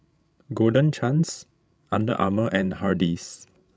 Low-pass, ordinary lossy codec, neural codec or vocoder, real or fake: none; none; codec, 16 kHz, 16 kbps, FreqCodec, larger model; fake